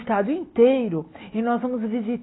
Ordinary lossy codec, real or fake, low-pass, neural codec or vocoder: AAC, 16 kbps; real; 7.2 kHz; none